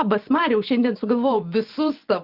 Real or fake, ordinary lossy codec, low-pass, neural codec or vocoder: fake; Opus, 32 kbps; 5.4 kHz; vocoder, 24 kHz, 100 mel bands, Vocos